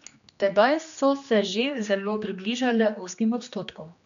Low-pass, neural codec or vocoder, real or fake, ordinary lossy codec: 7.2 kHz; codec, 16 kHz, 2 kbps, X-Codec, HuBERT features, trained on general audio; fake; none